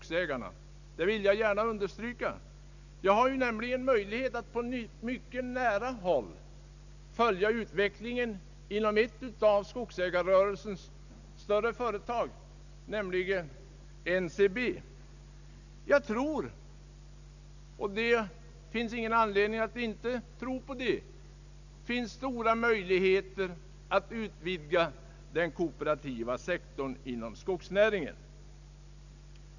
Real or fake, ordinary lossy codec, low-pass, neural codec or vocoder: real; none; 7.2 kHz; none